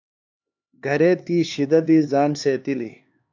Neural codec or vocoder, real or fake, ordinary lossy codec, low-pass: codec, 16 kHz, 2 kbps, X-Codec, HuBERT features, trained on LibriSpeech; fake; AAC, 48 kbps; 7.2 kHz